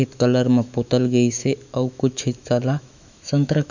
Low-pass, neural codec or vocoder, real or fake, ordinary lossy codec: 7.2 kHz; autoencoder, 48 kHz, 128 numbers a frame, DAC-VAE, trained on Japanese speech; fake; none